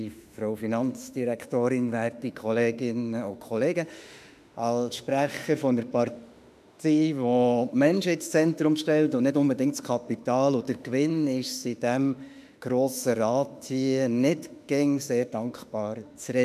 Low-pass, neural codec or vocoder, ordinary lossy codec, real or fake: 14.4 kHz; autoencoder, 48 kHz, 32 numbers a frame, DAC-VAE, trained on Japanese speech; none; fake